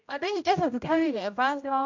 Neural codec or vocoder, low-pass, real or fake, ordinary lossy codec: codec, 16 kHz, 0.5 kbps, X-Codec, HuBERT features, trained on general audio; 7.2 kHz; fake; MP3, 48 kbps